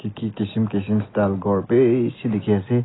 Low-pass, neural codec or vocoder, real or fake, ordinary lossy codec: 7.2 kHz; none; real; AAC, 16 kbps